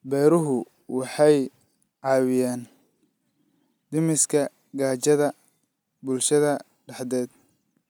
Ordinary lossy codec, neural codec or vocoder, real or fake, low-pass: none; none; real; none